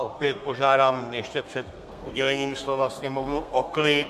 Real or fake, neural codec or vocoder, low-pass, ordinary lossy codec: fake; codec, 32 kHz, 1.9 kbps, SNAC; 14.4 kHz; MP3, 96 kbps